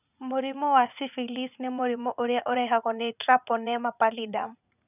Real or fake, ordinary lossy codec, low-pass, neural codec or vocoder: fake; none; 3.6 kHz; vocoder, 44.1 kHz, 80 mel bands, Vocos